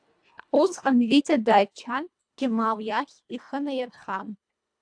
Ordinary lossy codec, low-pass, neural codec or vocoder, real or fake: AAC, 64 kbps; 9.9 kHz; codec, 24 kHz, 1.5 kbps, HILCodec; fake